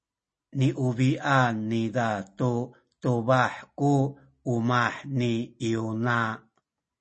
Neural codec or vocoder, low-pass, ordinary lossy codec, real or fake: none; 10.8 kHz; MP3, 32 kbps; real